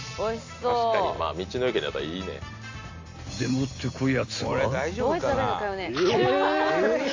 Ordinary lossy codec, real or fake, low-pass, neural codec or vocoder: AAC, 48 kbps; real; 7.2 kHz; none